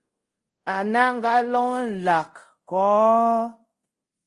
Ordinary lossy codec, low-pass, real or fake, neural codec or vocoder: Opus, 24 kbps; 10.8 kHz; fake; codec, 24 kHz, 0.5 kbps, DualCodec